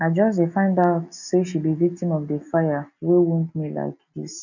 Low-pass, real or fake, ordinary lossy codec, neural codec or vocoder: 7.2 kHz; real; none; none